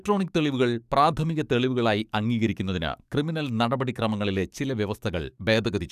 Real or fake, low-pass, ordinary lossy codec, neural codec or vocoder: fake; 14.4 kHz; none; codec, 44.1 kHz, 7.8 kbps, DAC